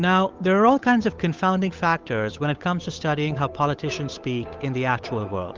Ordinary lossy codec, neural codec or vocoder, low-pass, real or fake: Opus, 32 kbps; codec, 16 kHz, 8 kbps, FunCodec, trained on Chinese and English, 25 frames a second; 7.2 kHz; fake